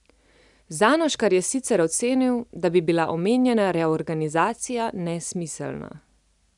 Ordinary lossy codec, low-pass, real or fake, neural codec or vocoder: none; 10.8 kHz; real; none